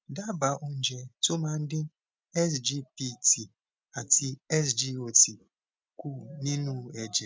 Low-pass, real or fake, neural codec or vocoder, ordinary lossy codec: none; real; none; none